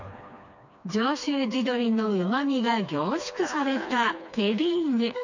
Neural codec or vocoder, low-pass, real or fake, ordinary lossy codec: codec, 16 kHz, 2 kbps, FreqCodec, smaller model; 7.2 kHz; fake; none